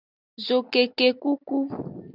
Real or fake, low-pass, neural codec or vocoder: real; 5.4 kHz; none